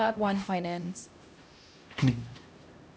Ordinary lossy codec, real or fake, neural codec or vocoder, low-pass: none; fake; codec, 16 kHz, 1 kbps, X-Codec, HuBERT features, trained on LibriSpeech; none